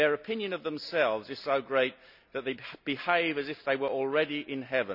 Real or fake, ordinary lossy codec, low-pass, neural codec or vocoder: real; none; 5.4 kHz; none